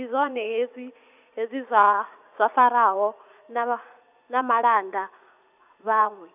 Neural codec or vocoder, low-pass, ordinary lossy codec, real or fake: vocoder, 44.1 kHz, 80 mel bands, Vocos; 3.6 kHz; none; fake